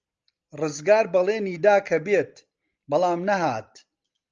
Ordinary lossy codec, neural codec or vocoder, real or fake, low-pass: Opus, 24 kbps; none; real; 7.2 kHz